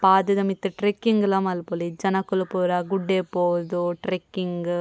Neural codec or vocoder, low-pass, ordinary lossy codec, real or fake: none; none; none; real